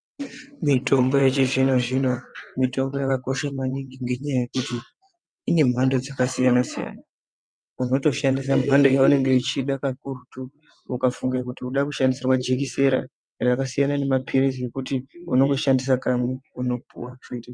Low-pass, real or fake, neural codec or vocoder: 9.9 kHz; fake; vocoder, 22.05 kHz, 80 mel bands, WaveNeXt